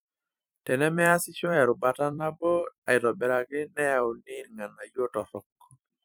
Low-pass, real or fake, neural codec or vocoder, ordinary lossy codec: none; fake; vocoder, 44.1 kHz, 128 mel bands every 256 samples, BigVGAN v2; none